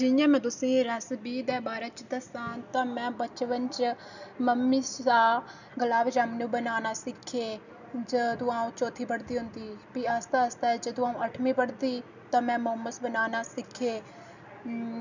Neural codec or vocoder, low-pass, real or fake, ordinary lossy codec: none; 7.2 kHz; real; none